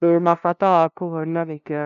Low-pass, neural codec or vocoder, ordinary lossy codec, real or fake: 7.2 kHz; codec, 16 kHz, 0.5 kbps, FunCodec, trained on LibriTTS, 25 frames a second; none; fake